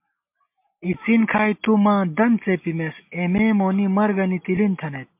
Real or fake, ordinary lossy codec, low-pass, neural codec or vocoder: real; MP3, 32 kbps; 3.6 kHz; none